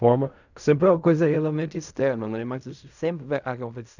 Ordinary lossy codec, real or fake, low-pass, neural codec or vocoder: none; fake; 7.2 kHz; codec, 16 kHz in and 24 kHz out, 0.4 kbps, LongCat-Audio-Codec, fine tuned four codebook decoder